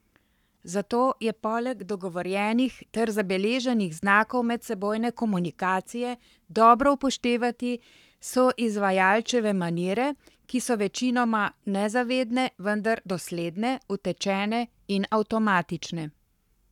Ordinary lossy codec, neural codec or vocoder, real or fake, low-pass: none; codec, 44.1 kHz, 7.8 kbps, Pupu-Codec; fake; 19.8 kHz